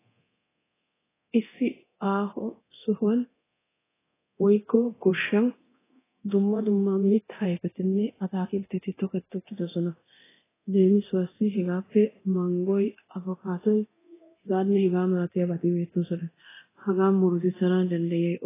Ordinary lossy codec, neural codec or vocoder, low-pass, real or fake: AAC, 16 kbps; codec, 24 kHz, 0.9 kbps, DualCodec; 3.6 kHz; fake